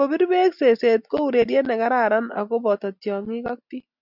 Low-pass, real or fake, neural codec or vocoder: 5.4 kHz; real; none